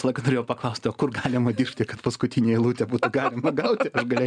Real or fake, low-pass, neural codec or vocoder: real; 9.9 kHz; none